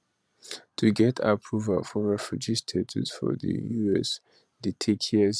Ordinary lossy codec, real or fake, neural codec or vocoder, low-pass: none; fake; vocoder, 22.05 kHz, 80 mel bands, Vocos; none